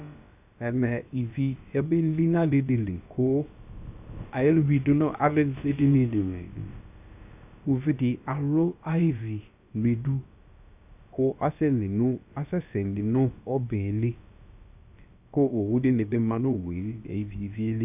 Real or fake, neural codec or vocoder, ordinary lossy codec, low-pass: fake; codec, 16 kHz, about 1 kbps, DyCAST, with the encoder's durations; AAC, 32 kbps; 3.6 kHz